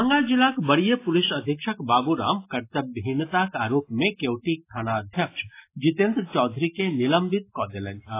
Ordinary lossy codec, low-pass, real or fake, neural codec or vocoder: AAC, 24 kbps; 3.6 kHz; real; none